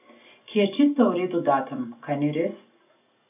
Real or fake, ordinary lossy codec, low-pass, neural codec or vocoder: real; none; 3.6 kHz; none